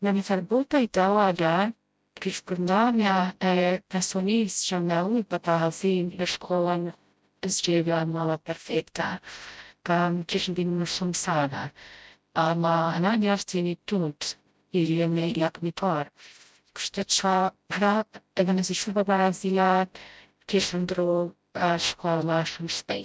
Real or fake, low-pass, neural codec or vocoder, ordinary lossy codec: fake; none; codec, 16 kHz, 0.5 kbps, FreqCodec, smaller model; none